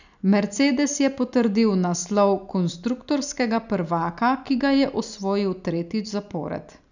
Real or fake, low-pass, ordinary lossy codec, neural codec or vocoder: real; 7.2 kHz; none; none